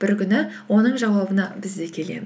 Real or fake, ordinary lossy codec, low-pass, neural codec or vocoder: real; none; none; none